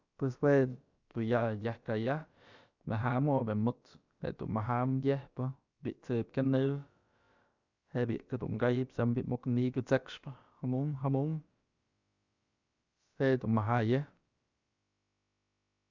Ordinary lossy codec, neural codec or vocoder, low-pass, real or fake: Opus, 64 kbps; codec, 16 kHz, about 1 kbps, DyCAST, with the encoder's durations; 7.2 kHz; fake